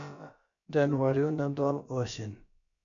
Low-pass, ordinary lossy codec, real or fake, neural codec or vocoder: 7.2 kHz; AAC, 64 kbps; fake; codec, 16 kHz, about 1 kbps, DyCAST, with the encoder's durations